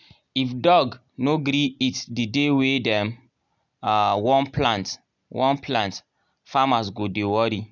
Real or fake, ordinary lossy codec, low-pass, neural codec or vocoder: real; none; 7.2 kHz; none